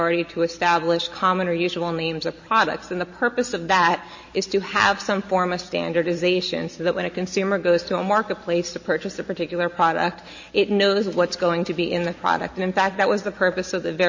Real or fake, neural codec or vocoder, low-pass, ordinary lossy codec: real; none; 7.2 kHz; MP3, 32 kbps